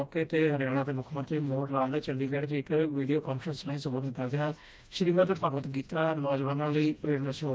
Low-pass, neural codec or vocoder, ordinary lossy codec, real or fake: none; codec, 16 kHz, 1 kbps, FreqCodec, smaller model; none; fake